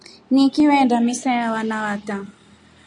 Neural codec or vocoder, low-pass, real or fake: none; 10.8 kHz; real